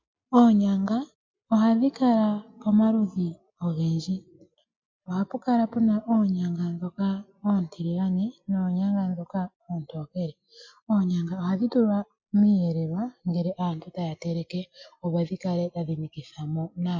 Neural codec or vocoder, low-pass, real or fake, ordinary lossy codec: none; 7.2 kHz; real; MP3, 48 kbps